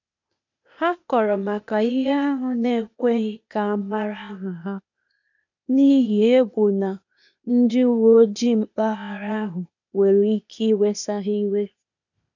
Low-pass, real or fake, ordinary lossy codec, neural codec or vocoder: 7.2 kHz; fake; none; codec, 16 kHz, 0.8 kbps, ZipCodec